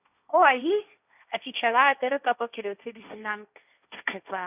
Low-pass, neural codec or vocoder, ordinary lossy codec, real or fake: 3.6 kHz; codec, 16 kHz, 1.1 kbps, Voila-Tokenizer; none; fake